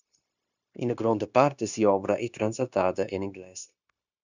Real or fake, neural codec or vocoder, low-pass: fake; codec, 16 kHz, 0.9 kbps, LongCat-Audio-Codec; 7.2 kHz